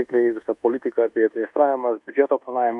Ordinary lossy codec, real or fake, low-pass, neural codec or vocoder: MP3, 96 kbps; fake; 10.8 kHz; codec, 24 kHz, 1.2 kbps, DualCodec